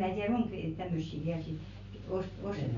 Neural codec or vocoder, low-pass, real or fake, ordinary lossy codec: none; 7.2 kHz; real; none